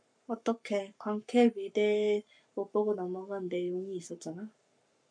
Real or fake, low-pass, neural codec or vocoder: fake; 9.9 kHz; codec, 44.1 kHz, 7.8 kbps, Pupu-Codec